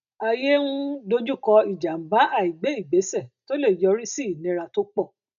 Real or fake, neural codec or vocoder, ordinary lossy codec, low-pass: real; none; none; 7.2 kHz